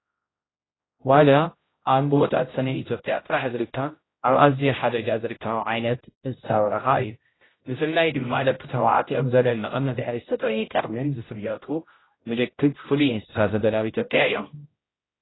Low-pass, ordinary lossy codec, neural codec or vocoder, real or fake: 7.2 kHz; AAC, 16 kbps; codec, 16 kHz, 0.5 kbps, X-Codec, HuBERT features, trained on general audio; fake